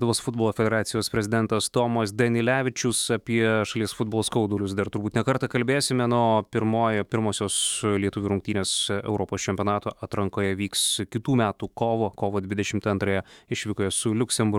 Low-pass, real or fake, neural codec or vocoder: 19.8 kHz; fake; autoencoder, 48 kHz, 128 numbers a frame, DAC-VAE, trained on Japanese speech